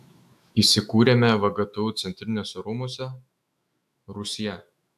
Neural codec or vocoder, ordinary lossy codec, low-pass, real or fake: autoencoder, 48 kHz, 128 numbers a frame, DAC-VAE, trained on Japanese speech; AAC, 96 kbps; 14.4 kHz; fake